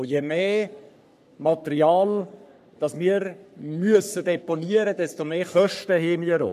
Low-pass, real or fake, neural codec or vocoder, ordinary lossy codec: 14.4 kHz; fake; codec, 44.1 kHz, 3.4 kbps, Pupu-Codec; none